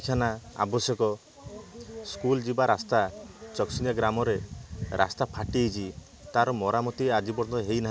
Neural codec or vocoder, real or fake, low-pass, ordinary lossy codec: none; real; none; none